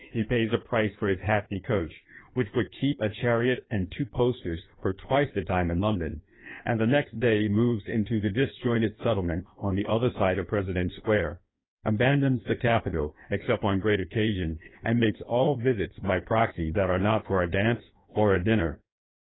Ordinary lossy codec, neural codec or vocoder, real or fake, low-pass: AAC, 16 kbps; codec, 16 kHz in and 24 kHz out, 1.1 kbps, FireRedTTS-2 codec; fake; 7.2 kHz